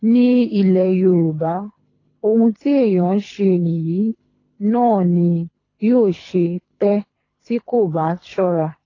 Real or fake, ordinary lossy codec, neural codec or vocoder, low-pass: fake; AAC, 32 kbps; codec, 24 kHz, 3 kbps, HILCodec; 7.2 kHz